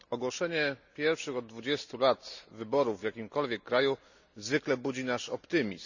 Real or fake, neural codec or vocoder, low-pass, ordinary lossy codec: real; none; 7.2 kHz; none